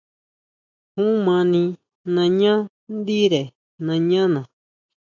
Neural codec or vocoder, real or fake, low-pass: none; real; 7.2 kHz